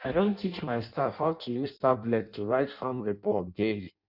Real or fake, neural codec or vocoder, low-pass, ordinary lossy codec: fake; codec, 16 kHz in and 24 kHz out, 0.6 kbps, FireRedTTS-2 codec; 5.4 kHz; none